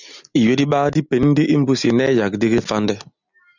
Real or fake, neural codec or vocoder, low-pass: real; none; 7.2 kHz